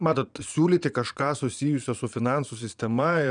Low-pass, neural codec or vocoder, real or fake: 9.9 kHz; vocoder, 22.05 kHz, 80 mel bands, Vocos; fake